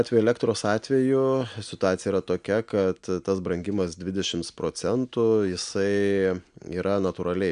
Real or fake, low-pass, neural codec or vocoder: real; 9.9 kHz; none